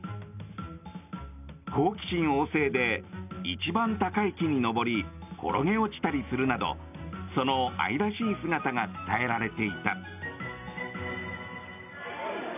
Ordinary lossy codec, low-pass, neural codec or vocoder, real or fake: none; 3.6 kHz; none; real